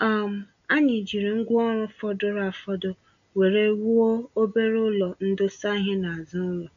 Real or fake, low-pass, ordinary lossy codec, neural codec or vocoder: real; 7.2 kHz; none; none